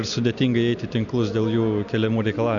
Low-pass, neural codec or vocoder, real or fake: 7.2 kHz; none; real